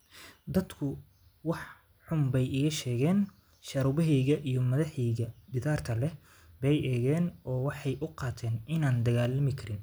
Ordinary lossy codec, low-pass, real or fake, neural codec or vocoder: none; none; real; none